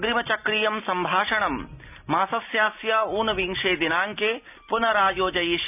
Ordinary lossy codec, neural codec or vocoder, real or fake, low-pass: none; none; real; 3.6 kHz